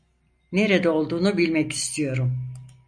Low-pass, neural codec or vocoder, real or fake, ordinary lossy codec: 9.9 kHz; none; real; MP3, 96 kbps